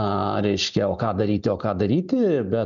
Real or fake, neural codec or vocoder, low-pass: real; none; 7.2 kHz